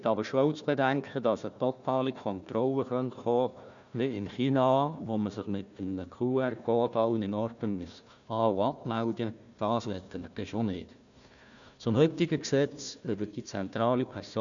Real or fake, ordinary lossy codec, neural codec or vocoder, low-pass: fake; none; codec, 16 kHz, 1 kbps, FunCodec, trained on Chinese and English, 50 frames a second; 7.2 kHz